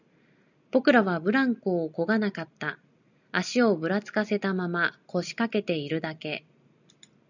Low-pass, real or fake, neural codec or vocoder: 7.2 kHz; real; none